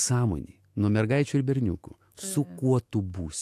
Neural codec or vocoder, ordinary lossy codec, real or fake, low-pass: autoencoder, 48 kHz, 128 numbers a frame, DAC-VAE, trained on Japanese speech; AAC, 64 kbps; fake; 14.4 kHz